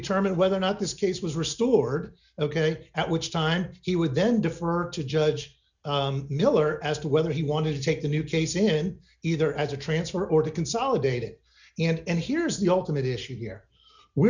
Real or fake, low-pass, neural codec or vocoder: real; 7.2 kHz; none